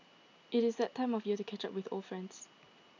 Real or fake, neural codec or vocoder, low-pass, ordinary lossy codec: real; none; 7.2 kHz; MP3, 64 kbps